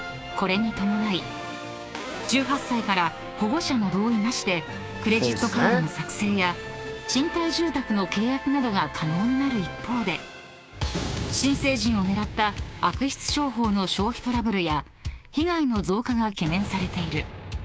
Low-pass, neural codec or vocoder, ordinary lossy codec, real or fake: none; codec, 16 kHz, 6 kbps, DAC; none; fake